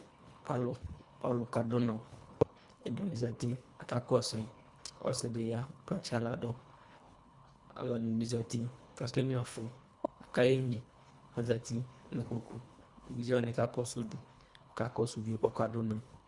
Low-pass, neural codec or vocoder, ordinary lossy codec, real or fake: 10.8 kHz; codec, 24 kHz, 1.5 kbps, HILCodec; Opus, 64 kbps; fake